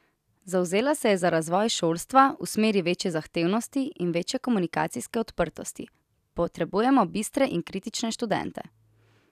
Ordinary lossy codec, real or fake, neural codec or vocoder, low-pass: none; real; none; 14.4 kHz